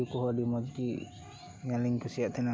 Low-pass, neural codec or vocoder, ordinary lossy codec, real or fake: none; none; none; real